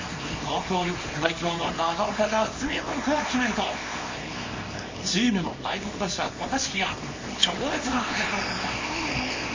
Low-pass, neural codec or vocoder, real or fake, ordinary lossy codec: 7.2 kHz; codec, 24 kHz, 0.9 kbps, WavTokenizer, small release; fake; MP3, 32 kbps